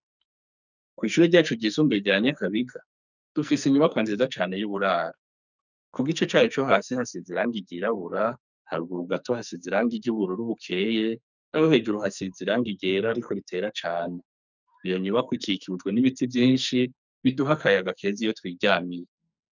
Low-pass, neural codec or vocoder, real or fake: 7.2 kHz; codec, 44.1 kHz, 2.6 kbps, SNAC; fake